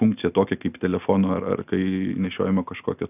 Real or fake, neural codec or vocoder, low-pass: real; none; 3.6 kHz